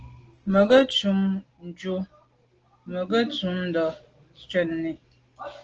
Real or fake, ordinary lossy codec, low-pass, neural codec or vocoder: real; Opus, 16 kbps; 7.2 kHz; none